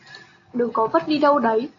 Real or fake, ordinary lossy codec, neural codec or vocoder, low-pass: real; AAC, 48 kbps; none; 7.2 kHz